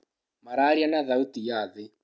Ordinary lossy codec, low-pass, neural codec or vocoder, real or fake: none; none; none; real